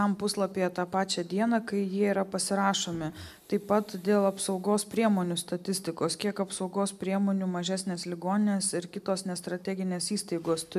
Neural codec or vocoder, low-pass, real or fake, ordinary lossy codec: none; 14.4 kHz; real; MP3, 96 kbps